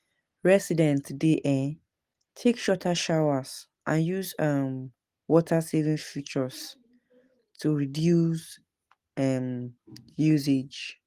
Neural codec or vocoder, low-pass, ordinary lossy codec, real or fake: none; 14.4 kHz; Opus, 24 kbps; real